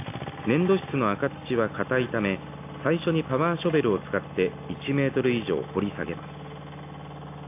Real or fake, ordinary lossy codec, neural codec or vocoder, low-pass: real; AAC, 24 kbps; none; 3.6 kHz